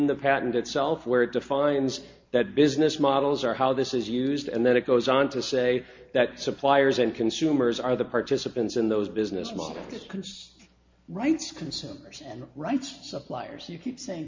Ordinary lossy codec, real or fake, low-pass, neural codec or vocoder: MP3, 48 kbps; real; 7.2 kHz; none